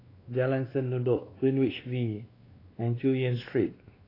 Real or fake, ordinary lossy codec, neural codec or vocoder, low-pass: fake; AAC, 24 kbps; codec, 16 kHz, 2 kbps, X-Codec, WavLM features, trained on Multilingual LibriSpeech; 5.4 kHz